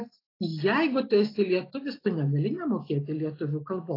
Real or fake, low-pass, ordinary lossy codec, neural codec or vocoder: real; 5.4 kHz; AAC, 24 kbps; none